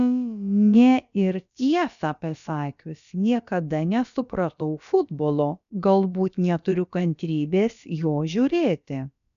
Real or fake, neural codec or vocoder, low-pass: fake; codec, 16 kHz, about 1 kbps, DyCAST, with the encoder's durations; 7.2 kHz